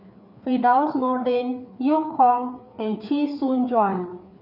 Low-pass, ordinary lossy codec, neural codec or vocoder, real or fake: 5.4 kHz; none; codec, 16 kHz, 4 kbps, FreqCodec, larger model; fake